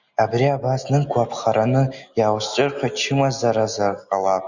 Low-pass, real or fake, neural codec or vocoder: 7.2 kHz; real; none